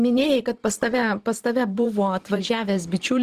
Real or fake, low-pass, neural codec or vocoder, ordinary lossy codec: fake; 14.4 kHz; vocoder, 44.1 kHz, 128 mel bands every 512 samples, BigVGAN v2; Opus, 16 kbps